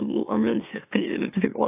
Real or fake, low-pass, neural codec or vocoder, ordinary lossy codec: fake; 3.6 kHz; autoencoder, 44.1 kHz, a latent of 192 numbers a frame, MeloTTS; AAC, 24 kbps